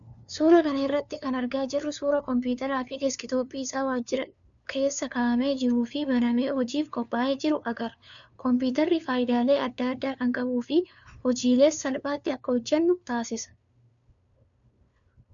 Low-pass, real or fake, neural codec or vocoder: 7.2 kHz; fake; codec, 16 kHz, 2 kbps, FunCodec, trained on LibriTTS, 25 frames a second